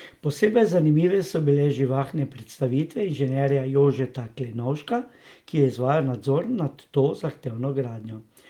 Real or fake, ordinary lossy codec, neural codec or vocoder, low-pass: real; Opus, 24 kbps; none; 19.8 kHz